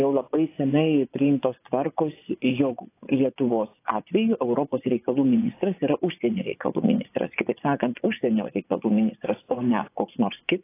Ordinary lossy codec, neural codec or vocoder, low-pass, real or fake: AAC, 24 kbps; vocoder, 24 kHz, 100 mel bands, Vocos; 3.6 kHz; fake